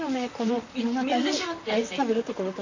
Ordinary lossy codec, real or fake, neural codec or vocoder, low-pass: AAC, 48 kbps; fake; vocoder, 44.1 kHz, 128 mel bands, Pupu-Vocoder; 7.2 kHz